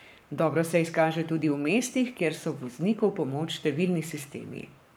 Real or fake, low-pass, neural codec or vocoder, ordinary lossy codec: fake; none; codec, 44.1 kHz, 7.8 kbps, Pupu-Codec; none